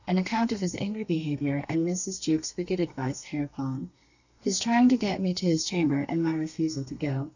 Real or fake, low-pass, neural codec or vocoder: fake; 7.2 kHz; codec, 32 kHz, 1.9 kbps, SNAC